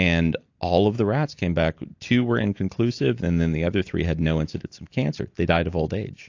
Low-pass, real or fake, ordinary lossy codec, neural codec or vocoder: 7.2 kHz; real; AAC, 48 kbps; none